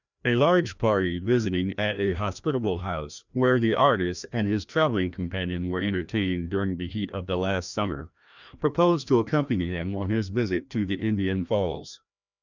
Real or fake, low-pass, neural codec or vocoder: fake; 7.2 kHz; codec, 16 kHz, 1 kbps, FreqCodec, larger model